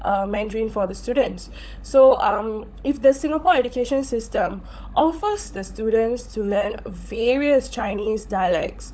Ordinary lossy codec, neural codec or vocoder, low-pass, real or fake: none; codec, 16 kHz, 16 kbps, FunCodec, trained on LibriTTS, 50 frames a second; none; fake